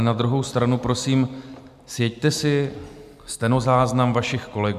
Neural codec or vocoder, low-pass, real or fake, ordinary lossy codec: none; 14.4 kHz; real; MP3, 96 kbps